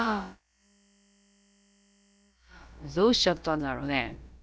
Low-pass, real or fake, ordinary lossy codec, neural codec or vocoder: none; fake; none; codec, 16 kHz, about 1 kbps, DyCAST, with the encoder's durations